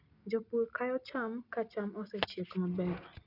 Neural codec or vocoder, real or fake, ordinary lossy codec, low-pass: none; real; none; 5.4 kHz